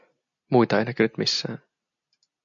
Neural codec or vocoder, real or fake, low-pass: none; real; 7.2 kHz